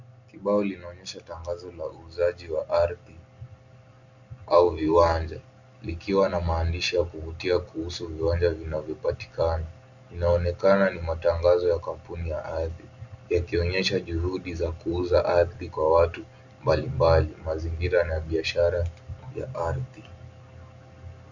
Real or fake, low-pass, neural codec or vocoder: real; 7.2 kHz; none